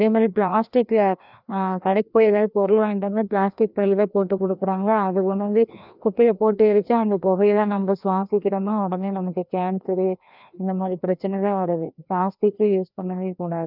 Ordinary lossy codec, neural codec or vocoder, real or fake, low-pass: none; codec, 16 kHz, 1 kbps, FreqCodec, larger model; fake; 5.4 kHz